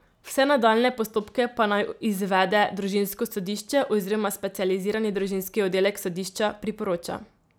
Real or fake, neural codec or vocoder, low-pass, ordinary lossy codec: real; none; none; none